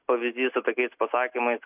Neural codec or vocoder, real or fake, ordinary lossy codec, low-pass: none; real; AAC, 32 kbps; 3.6 kHz